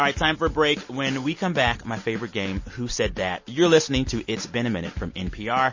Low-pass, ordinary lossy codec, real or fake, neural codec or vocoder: 7.2 kHz; MP3, 32 kbps; real; none